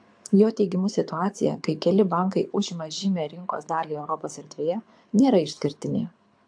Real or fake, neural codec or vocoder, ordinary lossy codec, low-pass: fake; codec, 24 kHz, 6 kbps, HILCodec; AAC, 64 kbps; 9.9 kHz